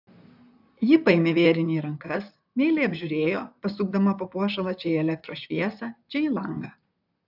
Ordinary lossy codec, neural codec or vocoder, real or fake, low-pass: AAC, 48 kbps; vocoder, 44.1 kHz, 128 mel bands, Pupu-Vocoder; fake; 5.4 kHz